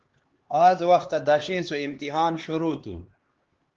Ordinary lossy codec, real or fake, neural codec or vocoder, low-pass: Opus, 16 kbps; fake; codec, 16 kHz, 2 kbps, X-Codec, HuBERT features, trained on LibriSpeech; 7.2 kHz